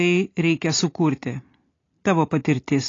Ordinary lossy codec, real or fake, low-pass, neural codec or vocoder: AAC, 32 kbps; real; 7.2 kHz; none